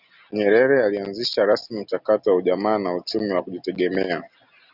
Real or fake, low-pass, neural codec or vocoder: real; 5.4 kHz; none